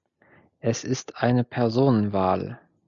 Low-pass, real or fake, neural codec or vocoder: 7.2 kHz; real; none